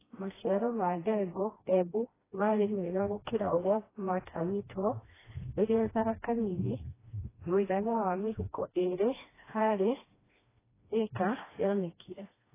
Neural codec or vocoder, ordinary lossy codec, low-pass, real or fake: codec, 16 kHz, 1 kbps, FreqCodec, smaller model; AAC, 16 kbps; 3.6 kHz; fake